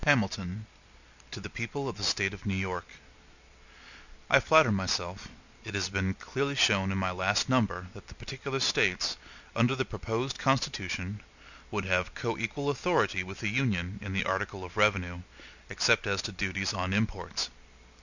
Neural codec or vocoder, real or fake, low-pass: none; real; 7.2 kHz